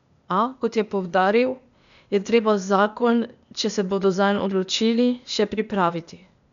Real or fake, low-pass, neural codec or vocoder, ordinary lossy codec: fake; 7.2 kHz; codec, 16 kHz, 0.8 kbps, ZipCodec; none